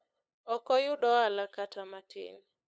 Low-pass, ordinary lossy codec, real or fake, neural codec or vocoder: none; none; fake; codec, 16 kHz, 8 kbps, FunCodec, trained on LibriTTS, 25 frames a second